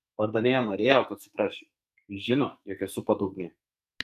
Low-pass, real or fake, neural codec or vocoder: 14.4 kHz; fake; codec, 44.1 kHz, 2.6 kbps, SNAC